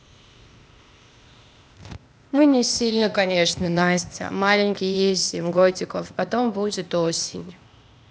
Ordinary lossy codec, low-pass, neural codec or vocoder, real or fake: none; none; codec, 16 kHz, 0.8 kbps, ZipCodec; fake